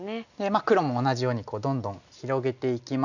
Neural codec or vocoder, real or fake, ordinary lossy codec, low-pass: none; real; none; 7.2 kHz